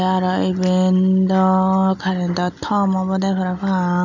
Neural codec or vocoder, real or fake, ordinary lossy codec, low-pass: none; real; none; 7.2 kHz